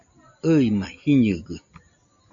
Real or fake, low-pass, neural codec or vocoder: real; 7.2 kHz; none